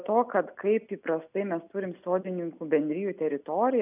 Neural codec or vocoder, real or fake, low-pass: none; real; 3.6 kHz